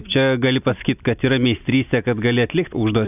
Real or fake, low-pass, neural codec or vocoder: real; 3.6 kHz; none